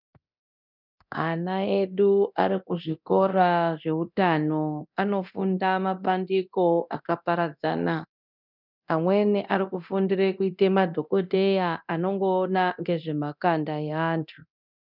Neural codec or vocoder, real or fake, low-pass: codec, 24 kHz, 0.9 kbps, DualCodec; fake; 5.4 kHz